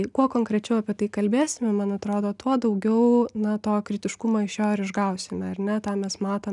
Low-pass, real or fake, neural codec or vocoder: 10.8 kHz; real; none